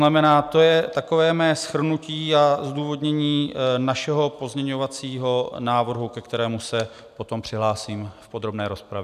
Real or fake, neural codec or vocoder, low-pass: real; none; 14.4 kHz